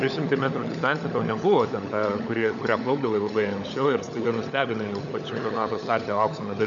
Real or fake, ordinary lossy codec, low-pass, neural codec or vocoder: fake; AAC, 64 kbps; 7.2 kHz; codec, 16 kHz, 16 kbps, FunCodec, trained on LibriTTS, 50 frames a second